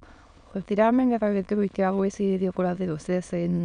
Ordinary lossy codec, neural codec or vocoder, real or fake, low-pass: none; autoencoder, 22.05 kHz, a latent of 192 numbers a frame, VITS, trained on many speakers; fake; 9.9 kHz